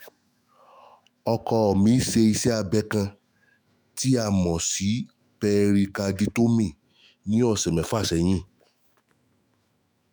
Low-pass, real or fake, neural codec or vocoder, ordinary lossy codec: none; fake; autoencoder, 48 kHz, 128 numbers a frame, DAC-VAE, trained on Japanese speech; none